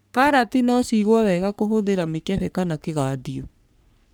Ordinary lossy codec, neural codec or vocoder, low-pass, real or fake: none; codec, 44.1 kHz, 3.4 kbps, Pupu-Codec; none; fake